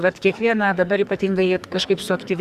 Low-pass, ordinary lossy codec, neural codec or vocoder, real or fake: 14.4 kHz; Opus, 64 kbps; codec, 44.1 kHz, 2.6 kbps, SNAC; fake